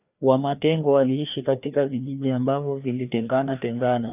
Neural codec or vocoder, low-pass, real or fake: codec, 16 kHz, 2 kbps, FreqCodec, larger model; 3.6 kHz; fake